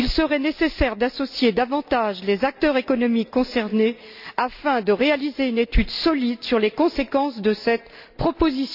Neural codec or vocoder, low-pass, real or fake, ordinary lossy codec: none; 5.4 kHz; real; none